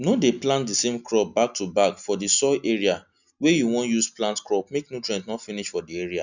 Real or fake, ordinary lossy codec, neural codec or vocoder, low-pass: real; none; none; 7.2 kHz